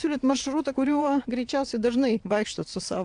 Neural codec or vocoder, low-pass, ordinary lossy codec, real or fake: vocoder, 22.05 kHz, 80 mel bands, WaveNeXt; 9.9 kHz; AAC, 64 kbps; fake